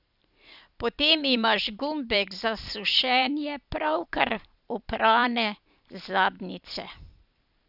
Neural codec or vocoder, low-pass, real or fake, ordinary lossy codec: vocoder, 44.1 kHz, 128 mel bands every 256 samples, BigVGAN v2; 5.4 kHz; fake; none